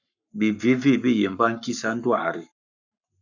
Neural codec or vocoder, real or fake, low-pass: codec, 44.1 kHz, 7.8 kbps, Pupu-Codec; fake; 7.2 kHz